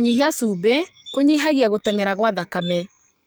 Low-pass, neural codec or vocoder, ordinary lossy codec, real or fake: none; codec, 44.1 kHz, 2.6 kbps, SNAC; none; fake